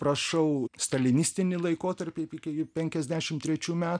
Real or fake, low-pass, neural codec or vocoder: real; 9.9 kHz; none